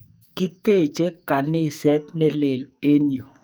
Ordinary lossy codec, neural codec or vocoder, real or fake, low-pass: none; codec, 44.1 kHz, 2.6 kbps, SNAC; fake; none